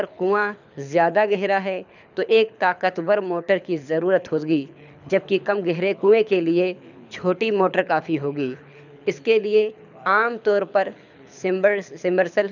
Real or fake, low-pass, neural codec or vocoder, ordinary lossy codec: fake; 7.2 kHz; codec, 24 kHz, 6 kbps, HILCodec; none